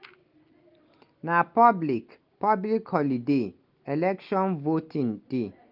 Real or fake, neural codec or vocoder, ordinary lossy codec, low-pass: real; none; Opus, 32 kbps; 5.4 kHz